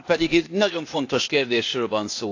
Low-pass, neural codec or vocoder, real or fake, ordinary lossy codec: 7.2 kHz; codec, 16 kHz, 0.8 kbps, ZipCodec; fake; AAC, 48 kbps